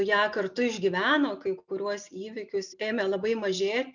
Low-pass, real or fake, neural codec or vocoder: 7.2 kHz; real; none